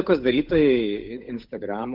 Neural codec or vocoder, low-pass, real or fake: codec, 16 kHz, 8 kbps, FunCodec, trained on Chinese and English, 25 frames a second; 5.4 kHz; fake